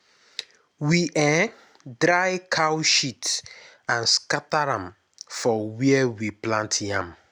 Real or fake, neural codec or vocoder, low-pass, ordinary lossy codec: real; none; none; none